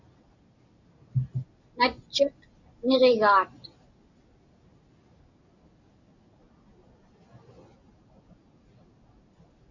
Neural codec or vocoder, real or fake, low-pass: none; real; 7.2 kHz